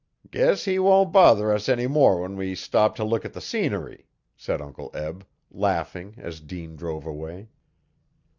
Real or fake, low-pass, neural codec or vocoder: real; 7.2 kHz; none